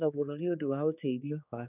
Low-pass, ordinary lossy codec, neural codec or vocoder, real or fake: 3.6 kHz; none; codec, 16 kHz, 4 kbps, X-Codec, HuBERT features, trained on general audio; fake